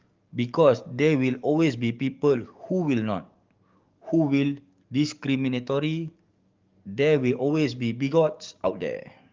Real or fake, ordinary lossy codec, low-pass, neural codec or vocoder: fake; Opus, 32 kbps; 7.2 kHz; codec, 44.1 kHz, 7.8 kbps, DAC